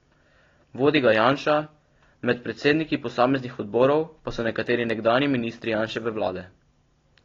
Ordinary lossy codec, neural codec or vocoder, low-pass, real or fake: AAC, 32 kbps; none; 7.2 kHz; real